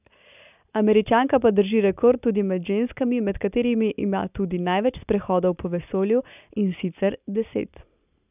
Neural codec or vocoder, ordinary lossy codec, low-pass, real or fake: none; none; 3.6 kHz; real